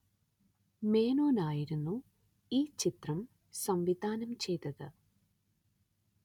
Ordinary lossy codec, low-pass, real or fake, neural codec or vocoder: none; 19.8 kHz; real; none